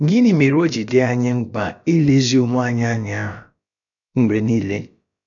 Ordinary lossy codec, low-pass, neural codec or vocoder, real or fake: none; 7.2 kHz; codec, 16 kHz, about 1 kbps, DyCAST, with the encoder's durations; fake